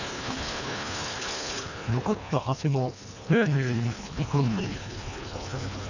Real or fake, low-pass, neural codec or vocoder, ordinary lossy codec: fake; 7.2 kHz; codec, 24 kHz, 1.5 kbps, HILCodec; none